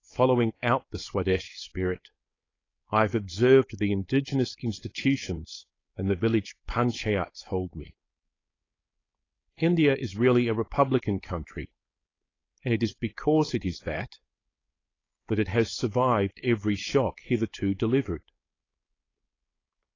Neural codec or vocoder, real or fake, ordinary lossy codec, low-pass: codec, 16 kHz, 4.8 kbps, FACodec; fake; AAC, 32 kbps; 7.2 kHz